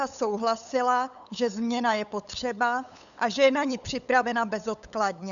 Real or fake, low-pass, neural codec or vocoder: fake; 7.2 kHz; codec, 16 kHz, 8 kbps, FunCodec, trained on LibriTTS, 25 frames a second